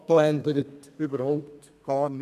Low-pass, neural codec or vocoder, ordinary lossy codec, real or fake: 14.4 kHz; codec, 32 kHz, 1.9 kbps, SNAC; none; fake